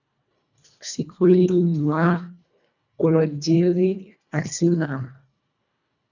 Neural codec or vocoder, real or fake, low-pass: codec, 24 kHz, 1.5 kbps, HILCodec; fake; 7.2 kHz